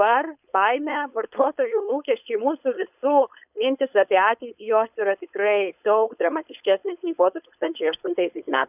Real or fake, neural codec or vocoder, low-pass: fake; codec, 16 kHz, 4.8 kbps, FACodec; 3.6 kHz